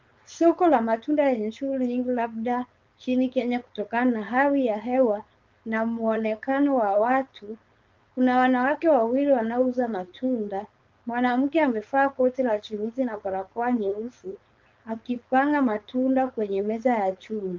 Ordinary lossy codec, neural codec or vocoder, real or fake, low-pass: Opus, 32 kbps; codec, 16 kHz, 4.8 kbps, FACodec; fake; 7.2 kHz